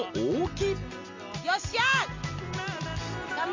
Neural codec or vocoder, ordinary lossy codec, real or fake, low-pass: none; MP3, 48 kbps; real; 7.2 kHz